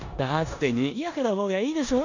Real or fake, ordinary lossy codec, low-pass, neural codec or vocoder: fake; none; 7.2 kHz; codec, 16 kHz in and 24 kHz out, 0.9 kbps, LongCat-Audio-Codec, four codebook decoder